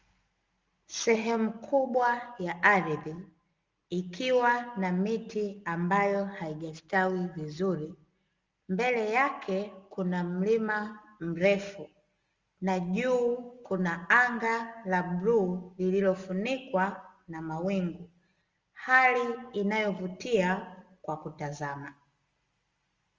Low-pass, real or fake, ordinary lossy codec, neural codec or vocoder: 7.2 kHz; real; Opus, 32 kbps; none